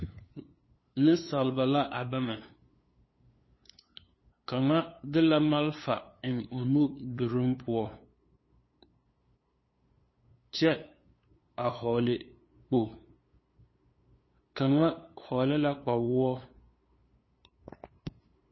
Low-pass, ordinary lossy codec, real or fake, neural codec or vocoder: 7.2 kHz; MP3, 24 kbps; fake; codec, 16 kHz, 2 kbps, FunCodec, trained on LibriTTS, 25 frames a second